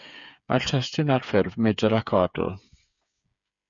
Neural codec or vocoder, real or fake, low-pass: codec, 16 kHz, 8 kbps, FreqCodec, smaller model; fake; 7.2 kHz